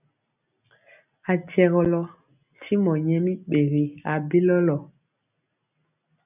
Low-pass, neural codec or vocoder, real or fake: 3.6 kHz; none; real